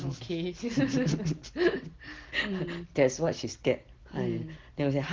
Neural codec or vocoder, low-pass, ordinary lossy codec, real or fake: none; 7.2 kHz; Opus, 16 kbps; real